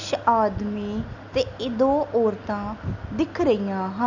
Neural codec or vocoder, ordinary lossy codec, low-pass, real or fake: none; none; 7.2 kHz; real